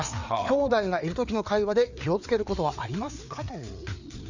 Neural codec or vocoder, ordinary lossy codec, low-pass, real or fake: codec, 16 kHz, 4 kbps, FreqCodec, larger model; none; 7.2 kHz; fake